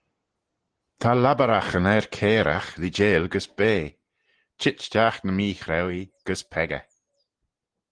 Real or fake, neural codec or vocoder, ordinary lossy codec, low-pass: real; none; Opus, 16 kbps; 9.9 kHz